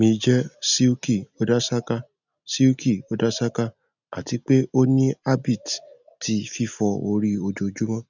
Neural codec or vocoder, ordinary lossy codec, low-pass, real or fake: none; none; 7.2 kHz; real